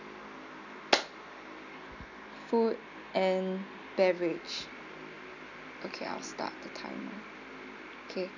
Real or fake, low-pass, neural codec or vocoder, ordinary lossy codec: real; 7.2 kHz; none; none